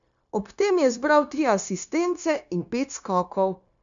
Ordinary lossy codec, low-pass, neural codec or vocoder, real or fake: none; 7.2 kHz; codec, 16 kHz, 0.9 kbps, LongCat-Audio-Codec; fake